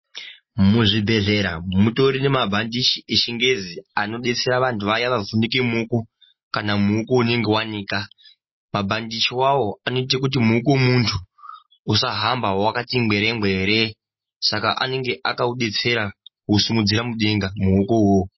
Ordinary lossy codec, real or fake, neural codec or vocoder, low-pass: MP3, 24 kbps; real; none; 7.2 kHz